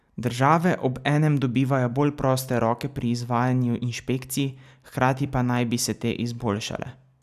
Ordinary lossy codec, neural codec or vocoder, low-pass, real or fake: none; none; 14.4 kHz; real